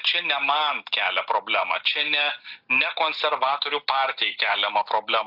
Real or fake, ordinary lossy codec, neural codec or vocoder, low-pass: real; AAC, 48 kbps; none; 5.4 kHz